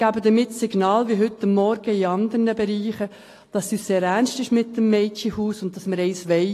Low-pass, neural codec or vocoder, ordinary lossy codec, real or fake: 14.4 kHz; none; AAC, 48 kbps; real